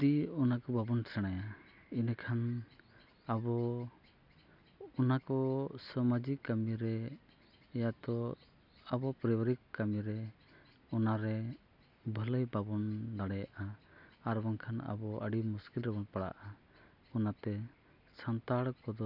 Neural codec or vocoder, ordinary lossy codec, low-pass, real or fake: none; none; 5.4 kHz; real